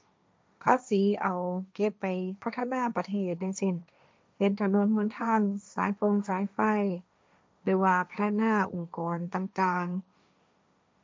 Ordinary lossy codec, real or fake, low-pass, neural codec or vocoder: none; fake; none; codec, 16 kHz, 1.1 kbps, Voila-Tokenizer